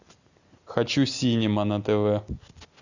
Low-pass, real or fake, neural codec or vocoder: 7.2 kHz; real; none